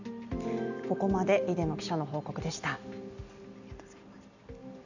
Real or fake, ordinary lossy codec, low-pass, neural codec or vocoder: real; none; 7.2 kHz; none